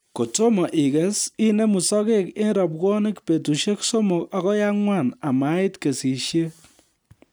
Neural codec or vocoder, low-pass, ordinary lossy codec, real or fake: none; none; none; real